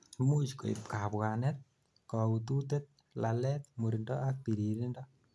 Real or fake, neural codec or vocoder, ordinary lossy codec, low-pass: real; none; none; none